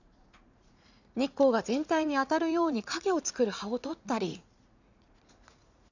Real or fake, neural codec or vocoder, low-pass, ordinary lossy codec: fake; vocoder, 44.1 kHz, 80 mel bands, Vocos; 7.2 kHz; none